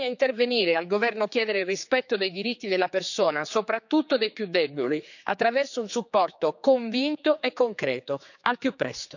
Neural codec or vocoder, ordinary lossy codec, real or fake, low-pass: codec, 16 kHz, 4 kbps, X-Codec, HuBERT features, trained on general audio; none; fake; 7.2 kHz